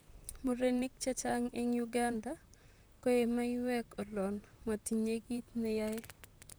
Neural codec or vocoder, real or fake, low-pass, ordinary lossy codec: vocoder, 44.1 kHz, 128 mel bands, Pupu-Vocoder; fake; none; none